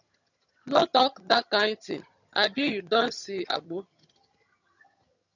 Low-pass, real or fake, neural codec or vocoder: 7.2 kHz; fake; vocoder, 22.05 kHz, 80 mel bands, HiFi-GAN